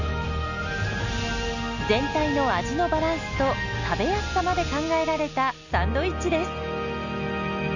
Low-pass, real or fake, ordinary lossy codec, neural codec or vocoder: 7.2 kHz; real; none; none